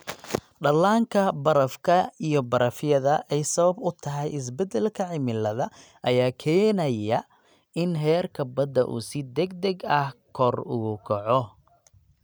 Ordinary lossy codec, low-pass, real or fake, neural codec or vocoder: none; none; real; none